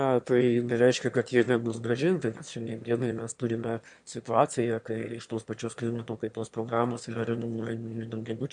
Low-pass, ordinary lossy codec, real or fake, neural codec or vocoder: 9.9 kHz; MP3, 64 kbps; fake; autoencoder, 22.05 kHz, a latent of 192 numbers a frame, VITS, trained on one speaker